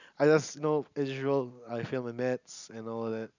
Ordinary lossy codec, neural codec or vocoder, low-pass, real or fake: none; none; 7.2 kHz; real